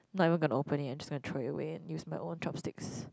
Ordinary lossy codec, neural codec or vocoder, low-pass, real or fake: none; none; none; real